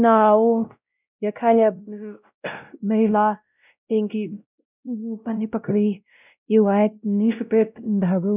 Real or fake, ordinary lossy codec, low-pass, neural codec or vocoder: fake; none; 3.6 kHz; codec, 16 kHz, 0.5 kbps, X-Codec, WavLM features, trained on Multilingual LibriSpeech